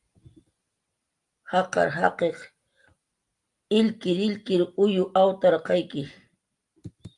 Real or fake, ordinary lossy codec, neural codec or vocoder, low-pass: real; Opus, 32 kbps; none; 10.8 kHz